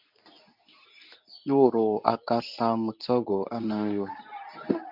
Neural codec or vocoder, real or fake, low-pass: codec, 24 kHz, 0.9 kbps, WavTokenizer, medium speech release version 2; fake; 5.4 kHz